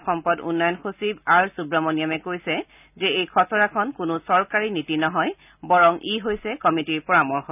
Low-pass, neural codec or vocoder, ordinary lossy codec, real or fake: 3.6 kHz; none; none; real